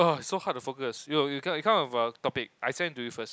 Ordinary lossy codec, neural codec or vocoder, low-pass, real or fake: none; none; none; real